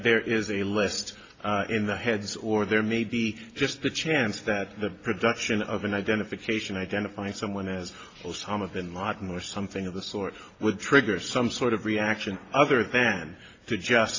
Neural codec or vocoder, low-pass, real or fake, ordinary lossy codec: none; 7.2 kHz; real; AAC, 32 kbps